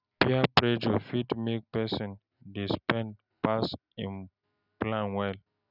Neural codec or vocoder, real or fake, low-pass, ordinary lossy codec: none; real; 5.4 kHz; none